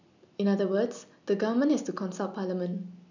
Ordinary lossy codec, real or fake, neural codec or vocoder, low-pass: none; real; none; 7.2 kHz